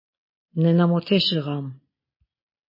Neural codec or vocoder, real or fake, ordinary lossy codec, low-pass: none; real; MP3, 24 kbps; 5.4 kHz